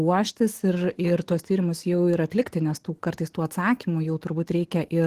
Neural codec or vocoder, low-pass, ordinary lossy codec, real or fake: autoencoder, 48 kHz, 128 numbers a frame, DAC-VAE, trained on Japanese speech; 14.4 kHz; Opus, 16 kbps; fake